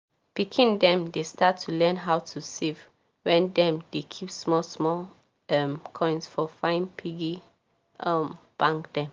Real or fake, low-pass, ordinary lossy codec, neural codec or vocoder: real; 7.2 kHz; Opus, 32 kbps; none